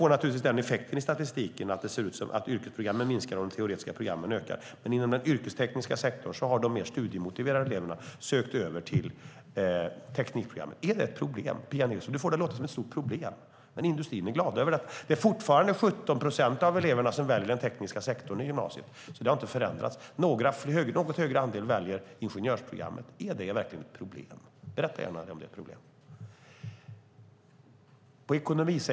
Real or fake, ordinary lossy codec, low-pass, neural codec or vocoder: real; none; none; none